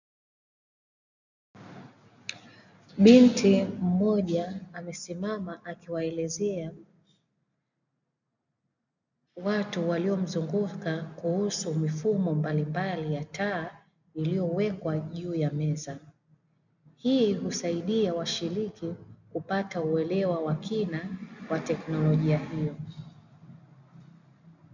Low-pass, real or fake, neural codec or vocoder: 7.2 kHz; real; none